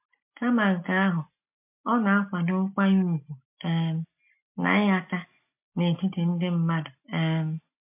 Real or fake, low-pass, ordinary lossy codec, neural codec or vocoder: real; 3.6 kHz; MP3, 32 kbps; none